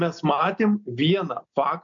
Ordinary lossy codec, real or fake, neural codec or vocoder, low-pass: MP3, 64 kbps; real; none; 7.2 kHz